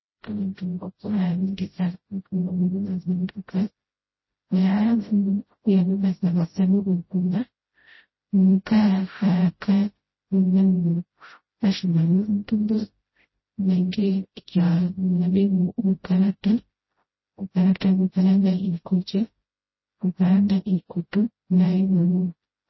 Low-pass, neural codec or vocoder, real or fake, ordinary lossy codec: 7.2 kHz; codec, 16 kHz, 0.5 kbps, FreqCodec, smaller model; fake; MP3, 24 kbps